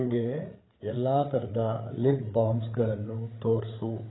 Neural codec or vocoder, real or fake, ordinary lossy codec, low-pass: codec, 16 kHz, 8 kbps, FreqCodec, larger model; fake; AAC, 16 kbps; 7.2 kHz